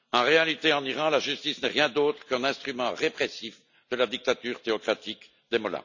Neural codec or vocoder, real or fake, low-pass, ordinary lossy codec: none; real; 7.2 kHz; none